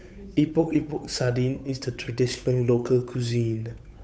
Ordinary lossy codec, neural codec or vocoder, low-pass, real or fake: none; codec, 16 kHz, 8 kbps, FunCodec, trained on Chinese and English, 25 frames a second; none; fake